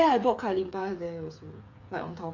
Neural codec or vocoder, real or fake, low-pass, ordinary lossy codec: codec, 16 kHz, 8 kbps, FreqCodec, smaller model; fake; 7.2 kHz; MP3, 48 kbps